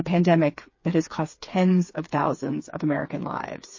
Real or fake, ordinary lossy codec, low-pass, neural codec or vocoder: fake; MP3, 32 kbps; 7.2 kHz; codec, 16 kHz, 4 kbps, FreqCodec, smaller model